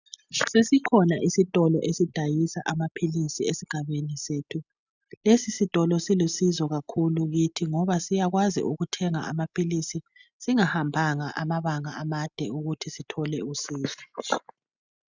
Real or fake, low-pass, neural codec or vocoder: real; 7.2 kHz; none